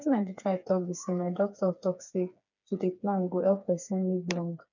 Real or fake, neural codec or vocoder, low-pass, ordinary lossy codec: fake; codec, 16 kHz, 4 kbps, FreqCodec, smaller model; 7.2 kHz; none